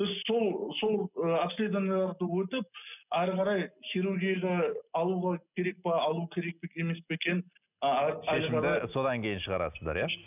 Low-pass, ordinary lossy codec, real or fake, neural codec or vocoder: 3.6 kHz; none; real; none